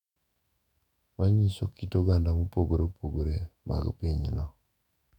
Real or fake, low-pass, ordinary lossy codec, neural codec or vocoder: fake; 19.8 kHz; none; autoencoder, 48 kHz, 128 numbers a frame, DAC-VAE, trained on Japanese speech